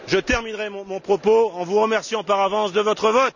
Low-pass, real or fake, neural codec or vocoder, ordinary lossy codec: 7.2 kHz; real; none; none